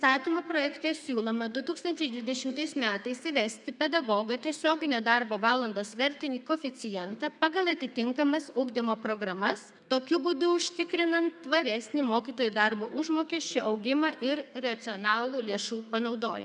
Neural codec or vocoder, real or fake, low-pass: codec, 32 kHz, 1.9 kbps, SNAC; fake; 10.8 kHz